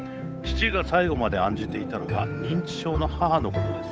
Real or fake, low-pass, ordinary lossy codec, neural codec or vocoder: fake; none; none; codec, 16 kHz, 8 kbps, FunCodec, trained on Chinese and English, 25 frames a second